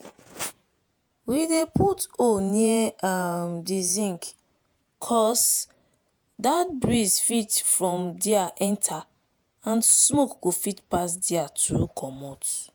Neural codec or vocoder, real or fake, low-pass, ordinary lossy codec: vocoder, 48 kHz, 128 mel bands, Vocos; fake; none; none